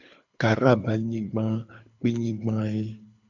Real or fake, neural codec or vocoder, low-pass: fake; codec, 24 kHz, 6 kbps, HILCodec; 7.2 kHz